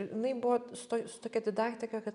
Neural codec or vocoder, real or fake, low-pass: none; real; 10.8 kHz